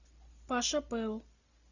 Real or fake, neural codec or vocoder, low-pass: real; none; 7.2 kHz